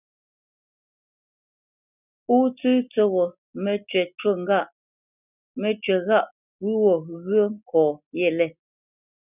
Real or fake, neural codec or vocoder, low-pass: real; none; 3.6 kHz